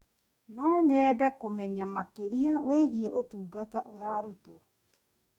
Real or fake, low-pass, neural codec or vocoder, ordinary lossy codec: fake; 19.8 kHz; codec, 44.1 kHz, 2.6 kbps, DAC; none